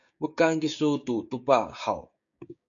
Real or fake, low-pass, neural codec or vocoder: fake; 7.2 kHz; codec, 16 kHz, 6 kbps, DAC